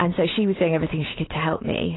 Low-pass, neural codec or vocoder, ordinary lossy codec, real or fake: 7.2 kHz; none; AAC, 16 kbps; real